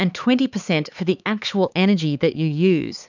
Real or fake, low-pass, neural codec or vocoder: fake; 7.2 kHz; codec, 16 kHz, 4 kbps, X-Codec, HuBERT features, trained on LibriSpeech